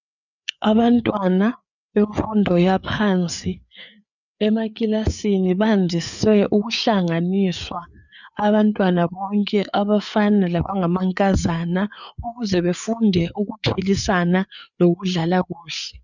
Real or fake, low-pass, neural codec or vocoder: fake; 7.2 kHz; codec, 16 kHz, 4 kbps, FreqCodec, larger model